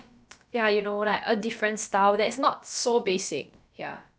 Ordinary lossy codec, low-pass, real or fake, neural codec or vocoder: none; none; fake; codec, 16 kHz, about 1 kbps, DyCAST, with the encoder's durations